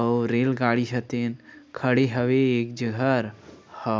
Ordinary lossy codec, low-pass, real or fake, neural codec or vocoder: none; none; real; none